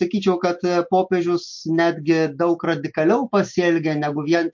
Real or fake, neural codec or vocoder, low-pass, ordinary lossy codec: real; none; 7.2 kHz; MP3, 48 kbps